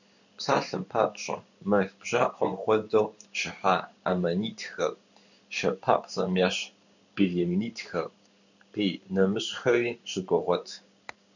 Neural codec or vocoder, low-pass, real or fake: codec, 16 kHz in and 24 kHz out, 1 kbps, XY-Tokenizer; 7.2 kHz; fake